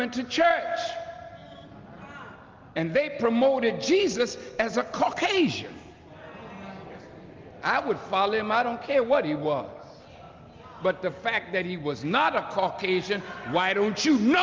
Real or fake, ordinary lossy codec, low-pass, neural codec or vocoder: real; Opus, 32 kbps; 7.2 kHz; none